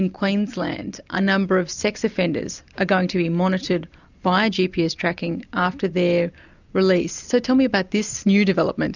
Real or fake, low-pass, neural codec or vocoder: real; 7.2 kHz; none